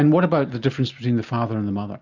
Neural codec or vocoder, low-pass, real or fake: none; 7.2 kHz; real